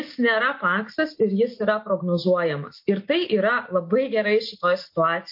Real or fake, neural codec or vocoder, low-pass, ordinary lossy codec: real; none; 5.4 kHz; MP3, 32 kbps